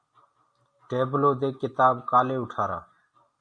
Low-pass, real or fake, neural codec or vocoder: 9.9 kHz; real; none